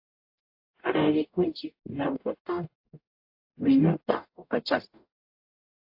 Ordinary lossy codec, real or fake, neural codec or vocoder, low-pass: AAC, 48 kbps; fake; codec, 44.1 kHz, 0.9 kbps, DAC; 5.4 kHz